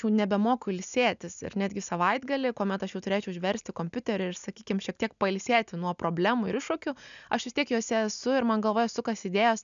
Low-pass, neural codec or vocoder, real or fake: 7.2 kHz; none; real